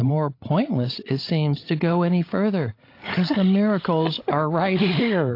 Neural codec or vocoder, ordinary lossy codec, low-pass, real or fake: codec, 16 kHz, 16 kbps, FreqCodec, larger model; AAC, 32 kbps; 5.4 kHz; fake